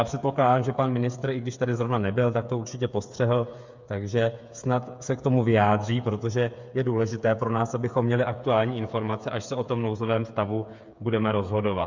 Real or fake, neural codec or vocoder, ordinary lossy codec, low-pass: fake; codec, 16 kHz, 8 kbps, FreqCodec, smaller model; MP3, 64 kbps; 7.2 kHz